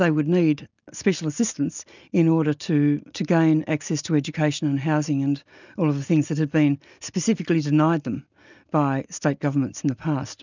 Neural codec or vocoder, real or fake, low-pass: none; real; 7.2 kHz